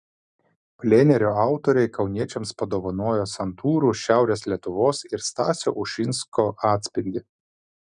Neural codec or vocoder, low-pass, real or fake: none; 9.9 kHz; real